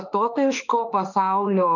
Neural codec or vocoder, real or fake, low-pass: autoencoder, 48 kHz, 32 numbers a frame, DAC-VAE, trained on Japanese speech; fake; 7.2 kHz